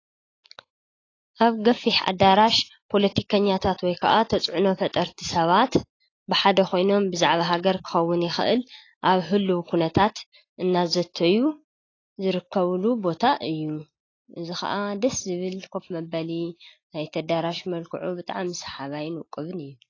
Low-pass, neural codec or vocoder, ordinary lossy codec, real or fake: 7.2 kHz; none; AAC, 32 kbps; real